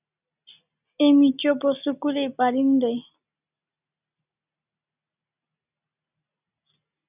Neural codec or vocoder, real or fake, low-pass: none; real; 3.6 kHz